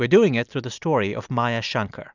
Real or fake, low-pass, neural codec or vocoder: real; 7.2 kHz; none